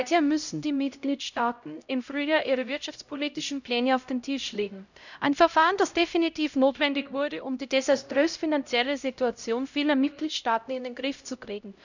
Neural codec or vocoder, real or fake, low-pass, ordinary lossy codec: codec, 16 kHz, 0.5 kbps, X-Codec, HuBERT features, trained on LibriSpeech; fake; 7.2 kHz; none